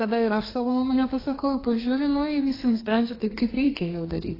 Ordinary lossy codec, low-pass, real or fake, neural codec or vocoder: AAC, 24 kbps; 5.4 kHz; fake; codec, 32 kHz, 1.9 kbps, SNAC